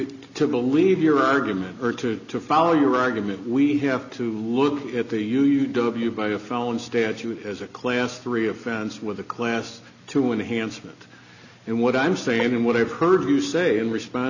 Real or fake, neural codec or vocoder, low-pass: real; none; 7.2 kHz